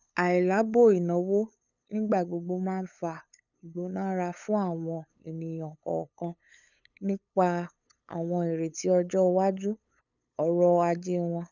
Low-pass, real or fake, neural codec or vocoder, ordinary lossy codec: 7.2 kHz; fake; codec, 16 kHz, 8 kbps, FunCodec, trained on LibriTTS, 25 frames a second; none